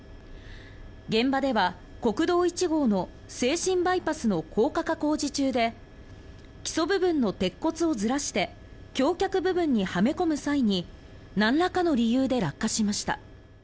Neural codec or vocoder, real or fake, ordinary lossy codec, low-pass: none; real; none; none